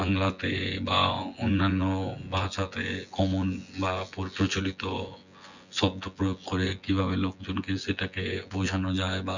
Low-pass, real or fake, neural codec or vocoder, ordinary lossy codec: 7.2 kHz; fake; vocoder, 24 kHz, 100 mel bands, Vocos; none